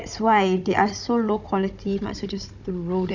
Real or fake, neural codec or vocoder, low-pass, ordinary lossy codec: fake; codec, 16 kHz, 16 kbps, FunCodec, trained on Chinese and English, 50 frames a second; 7.2 kHz; none